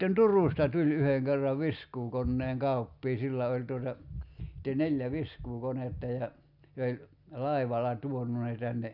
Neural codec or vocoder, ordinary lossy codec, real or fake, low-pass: none; none; real; 5.4 kHz